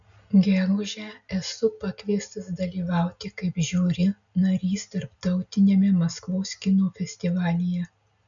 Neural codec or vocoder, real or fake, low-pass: none; real; 7.2 kHz